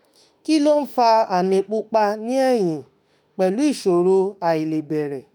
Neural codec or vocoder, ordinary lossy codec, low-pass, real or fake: autoencoder, 48 kHz, 32 numbers a frame, DAC-VAE, trained on Japanese speech; none; none; fake